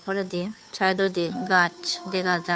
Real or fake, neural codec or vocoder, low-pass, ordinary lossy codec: fake; codec, 16 kHz, 2 kbps, FunCodec, trained on Chinese and English, 25 frames a second; none; none